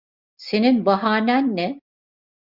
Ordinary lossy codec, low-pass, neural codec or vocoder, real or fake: Opus, 64 kbps; 5.4 kHz; none; real